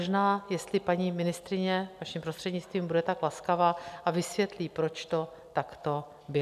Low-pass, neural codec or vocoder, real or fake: 14.4 kHz; none; real